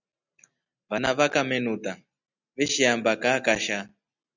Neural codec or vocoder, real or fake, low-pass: none; real; 7.2 kHz